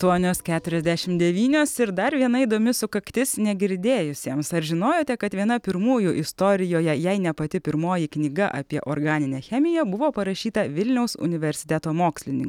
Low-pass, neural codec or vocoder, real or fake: 19.8 kHz; none; real